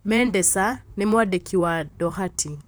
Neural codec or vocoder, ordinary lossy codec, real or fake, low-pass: vocoder, 44.1 kHz, 128 mel bands, Pupu-Vocoder; none; fake; none